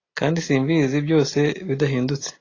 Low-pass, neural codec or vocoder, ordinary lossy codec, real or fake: 7.2 kHz; none; AAC, 32 kbps; real